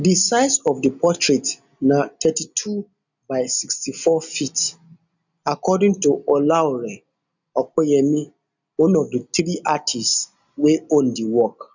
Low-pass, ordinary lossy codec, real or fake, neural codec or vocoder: 7.2 kHz; none; real; none